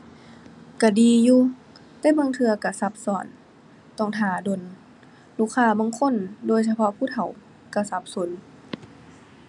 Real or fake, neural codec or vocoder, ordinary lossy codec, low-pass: real; none; none; 10.8 kHz